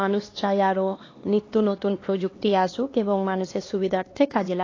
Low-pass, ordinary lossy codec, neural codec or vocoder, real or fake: 7.2 kHz; AAC, 32 kbps; codec, 16 kHz, 2 kbps, X-Codec, HuBERT features, trained on LibriSpeech; fake